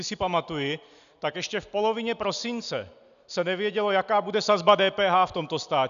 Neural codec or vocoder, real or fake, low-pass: none; real; 7.2 kHz